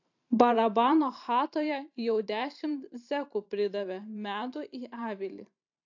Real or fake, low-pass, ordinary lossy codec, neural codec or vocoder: fake; 7.2 kHz; AAC, 48 kbps; vocoder, 44.1 kHz, 128 mel bands every 512 samples, BigVGAN v2